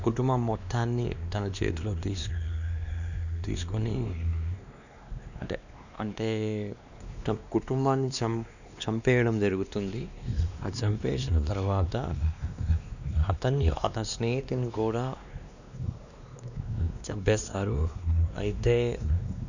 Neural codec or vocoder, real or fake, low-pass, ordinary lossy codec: codec, 16 kHz, 2 kbps, X-Codec, WavLM features, trained on Multilingual LibriSpeech; fake; 7.2 kHz; none